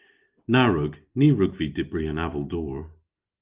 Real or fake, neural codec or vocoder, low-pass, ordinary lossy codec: real; none; 3.6 kHz; Opus, 32 kbps